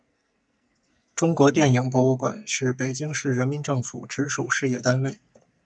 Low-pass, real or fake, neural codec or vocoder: 9.9 kHz; fake; codec, 44.1 kHz, 2.6 kbps, SNAC